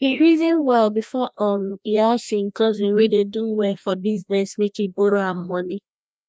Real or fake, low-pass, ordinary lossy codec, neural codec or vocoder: fake; none; none; codec, 16 kHz, 1 kbps, FreqCodec, larger model